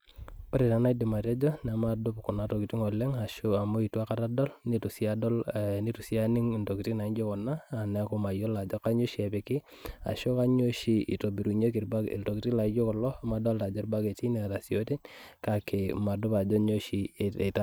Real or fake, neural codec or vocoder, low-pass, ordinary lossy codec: real; none; none; none